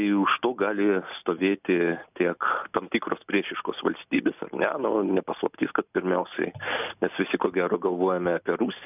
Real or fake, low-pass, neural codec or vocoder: real; 3.6 kHz; none